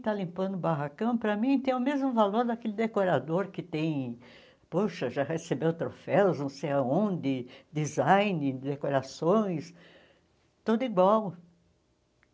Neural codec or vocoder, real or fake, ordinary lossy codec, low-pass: none; real; none; none